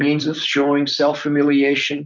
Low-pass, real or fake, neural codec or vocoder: 7.2 kHz; fake; vocoder, 44.1 kHz, 128 mel bands, Pupu-Vocoder